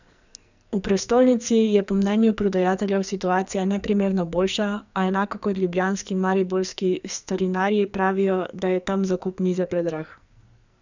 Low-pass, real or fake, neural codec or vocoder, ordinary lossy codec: 7.2 kHz; fake; codec, 44.1 kHz, 2.6 kbps, SNAC; none